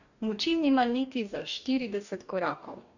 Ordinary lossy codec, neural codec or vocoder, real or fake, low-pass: none; codec, 44.1 kHz, 2.6 kbps, DAC; fake; 7.2 kHz